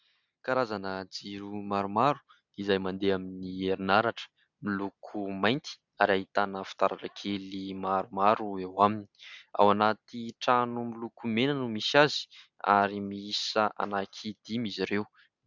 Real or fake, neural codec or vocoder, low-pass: real; none; 7.2 kHz